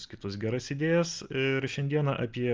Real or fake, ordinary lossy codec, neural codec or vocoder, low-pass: real; Opus, 24 kbps; none; 7.2 kHz